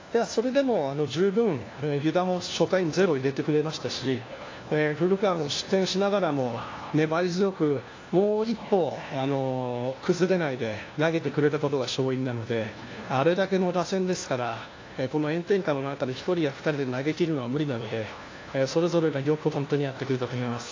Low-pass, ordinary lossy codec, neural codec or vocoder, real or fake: 7.2 kHz; AAC, 32 kbps; codec, 16 kHz, 1 kbps, FunCodec, trained on LibriTTS, 50 frames a second; fake